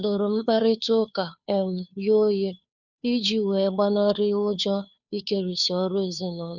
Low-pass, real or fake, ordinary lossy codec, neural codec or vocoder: 7.2 kHz; fake; none; codec, 16 kHz, 2 kbps, FunCodec, trained on Chinese and English, 25 frames a second